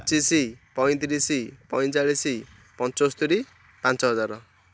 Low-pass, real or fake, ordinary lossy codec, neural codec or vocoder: none; real; none; none